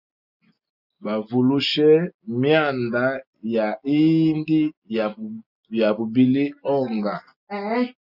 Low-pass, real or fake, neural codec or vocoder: 5.4 kHz; real; none